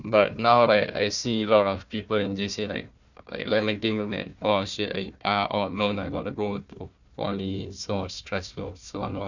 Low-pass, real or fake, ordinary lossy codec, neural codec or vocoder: 7.2 kHz; fake; none; codec, 16 kHz, 1 kbps, FunCodec, trained on Chinese and English, 50 frames a second